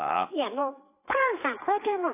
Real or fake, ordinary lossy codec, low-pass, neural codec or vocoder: fake; AAC, 24 kbps; 3.6 kHz; codec, 16 kHz, 4 kbps, FreqCodec, larger model